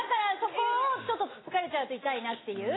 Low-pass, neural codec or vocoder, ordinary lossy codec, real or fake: 7.2 kHz; none; AAC, 16 kbps; real